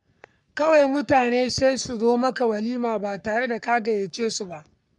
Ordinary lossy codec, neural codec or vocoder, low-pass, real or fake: none; codec, 44.1 kHz, 3.4 kbps, Pupu-Codec; 10.8 kHz; fake